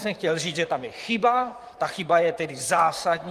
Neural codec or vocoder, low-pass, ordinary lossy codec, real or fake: vocoder, 44.1 kHz, 128 mel bands, Pupu-Vocoder; 14.4 kHz; Opus, 32 kbps; fake